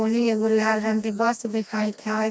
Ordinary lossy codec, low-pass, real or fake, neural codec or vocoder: none; none; fake; codec, 16 kHz, 1 kbps, FreqCodec, smaller model